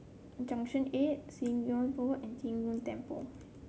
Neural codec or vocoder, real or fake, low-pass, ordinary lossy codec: none; real; none; none